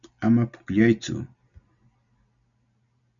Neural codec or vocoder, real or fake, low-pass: none; real; 7.2 kHz